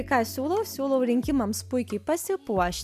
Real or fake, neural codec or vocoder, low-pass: real; none; 14.4 kHz